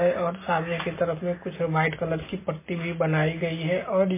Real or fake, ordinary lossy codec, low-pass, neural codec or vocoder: real; MP3, 16 kbps; 3.6 kHz; none